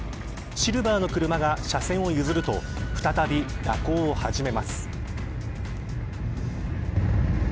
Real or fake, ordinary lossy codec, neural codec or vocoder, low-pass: real; none; none; none